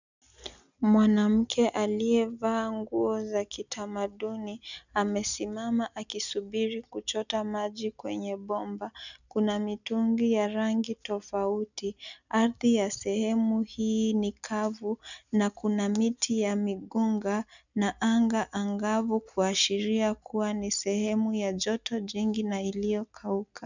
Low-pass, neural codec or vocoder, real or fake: 7.2 kHz; none; real